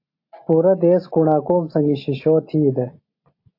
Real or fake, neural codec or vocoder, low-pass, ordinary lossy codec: real; none; 5.4 kHz; AAC, 32 kbps